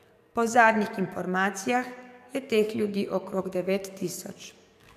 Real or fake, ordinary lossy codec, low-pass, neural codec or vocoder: fake; none; 14.4 kHz; codec, 44.1 kHz, 7.8 kbps, DAC